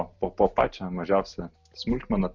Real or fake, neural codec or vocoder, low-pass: real; none; 7.2 kHz